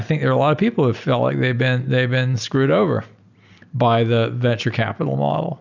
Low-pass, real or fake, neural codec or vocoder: 7.2 kHz; real; none